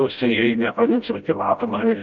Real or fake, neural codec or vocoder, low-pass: fake; codec, 16 kHz, 0.5 kbps, FreqCodec, smaller model; 7.2 kHz